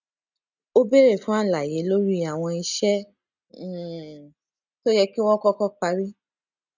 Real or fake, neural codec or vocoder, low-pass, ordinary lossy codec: real; none; 7.2 kHz; none